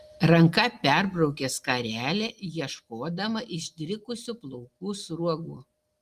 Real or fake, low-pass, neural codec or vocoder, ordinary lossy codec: real; 14.4 kHz; none; Opus, 24 kbps